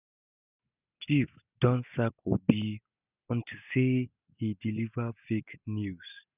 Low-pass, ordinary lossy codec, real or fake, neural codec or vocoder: 3.6 kHz; none; real; none